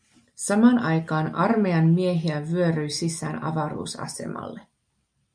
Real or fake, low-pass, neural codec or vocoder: real; 9.9 kHz; none